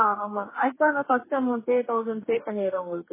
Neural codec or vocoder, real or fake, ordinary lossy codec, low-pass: codec, 44.1 kHz, 2.6 kbps, DAC; fake; MP3, 16 kbps; 3.6 kHz